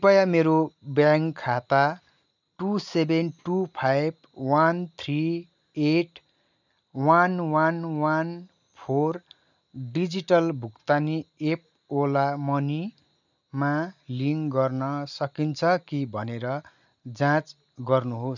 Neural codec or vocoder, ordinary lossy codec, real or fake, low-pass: none; none; real; 7.2 kHz